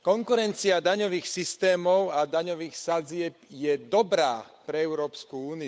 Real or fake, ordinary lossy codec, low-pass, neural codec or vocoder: fake; none; none; codec, 16 kHz, 8 kbps, FunCodec, trained on Chinese and English, 25 frames a second